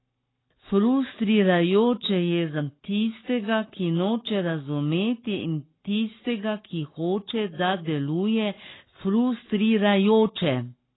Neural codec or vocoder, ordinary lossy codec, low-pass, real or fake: codec, 44.1 kHz, 7.8 kbps, Pupu-Codec; AAC, 16 kbps; 7.2 kHz; fake